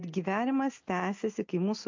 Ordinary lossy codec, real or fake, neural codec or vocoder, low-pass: MP3, 48 kbps; real; none; 7.2 kHz